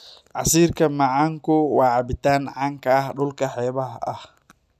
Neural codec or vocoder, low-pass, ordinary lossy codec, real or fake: none; 14.4 kHz; none; real